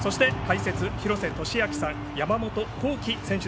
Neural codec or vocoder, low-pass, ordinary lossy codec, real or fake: none; none; none; real